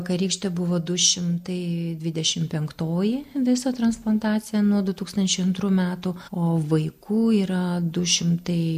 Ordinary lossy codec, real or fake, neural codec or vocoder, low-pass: MP3, 96 kbps; real; none; 14.4 kHz